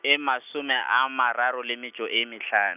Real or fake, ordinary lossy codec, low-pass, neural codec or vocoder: real; none; 3.6 kHz; none